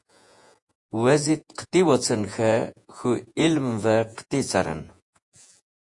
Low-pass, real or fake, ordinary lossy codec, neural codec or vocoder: 10.8 kHz; fake; AAC, 64 kbps; vocoder, 48 kHz, 128 mel bands, Vocos